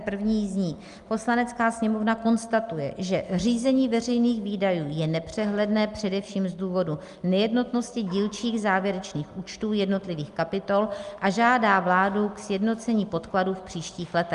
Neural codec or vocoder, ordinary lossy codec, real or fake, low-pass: none; Opus, 32 kbps; real; 10.8 kHz